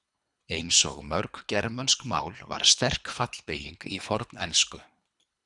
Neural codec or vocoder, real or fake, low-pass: codec, 24 kHz, 3 kbps, HILCodec; fake; 10.8 kHz